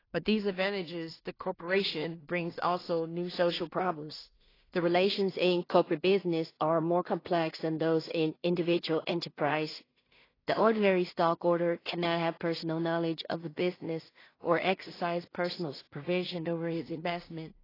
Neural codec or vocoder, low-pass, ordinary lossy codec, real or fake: codec, 16 kHz in and 24 kHz out, 0.4 kbps, LongCat-Audio-Codec, two codebook decoder; 5.4 kHz; AAC, 24 kbps; fake